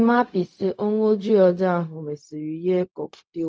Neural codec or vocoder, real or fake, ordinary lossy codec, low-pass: codec, 16 kHz, 0.4 kbps, LongCat-Audio-Codec; fake; none; none